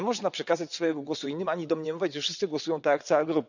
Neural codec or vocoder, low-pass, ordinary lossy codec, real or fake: vocoder, 22.05 kHz, 80 mel bands, WaveNeXt; 7.2 kHz; none; fake